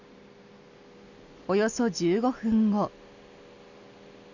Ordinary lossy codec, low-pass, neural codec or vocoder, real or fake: none; 7.2 kHz; none; real